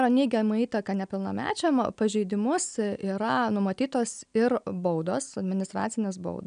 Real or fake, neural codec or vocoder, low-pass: real; none; 9.9 kHz